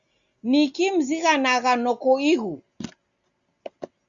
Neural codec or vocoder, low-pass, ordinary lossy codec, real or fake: none; 7.2 kHz; Opus, 64 kbps; real